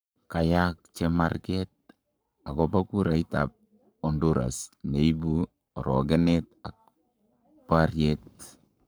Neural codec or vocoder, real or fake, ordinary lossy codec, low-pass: codec, 44.1 kHz, 7.8 kbps, Pupu-Codec; fake; none; none